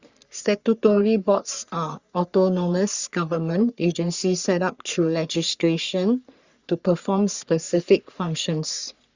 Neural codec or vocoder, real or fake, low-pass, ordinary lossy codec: codec, 44.1 kHz, 3.4 kbps, Pupu-Codec; fake; 7.2 kHz; Opus, 64 kbps